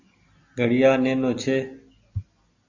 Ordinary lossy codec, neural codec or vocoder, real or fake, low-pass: AAC, 48 kbps; none; real; 7.2 kHz